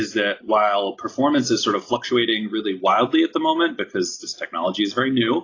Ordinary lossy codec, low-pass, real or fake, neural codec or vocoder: AAC, 32 kbps; 7.2 kHz; real; none